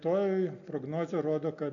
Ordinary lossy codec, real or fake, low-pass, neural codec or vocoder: MP3, 96 kbps; real; 7.2 kHz; none